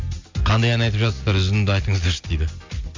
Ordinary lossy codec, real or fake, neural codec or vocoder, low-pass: MP3, 64 kbps; real; none; 7.2 kHz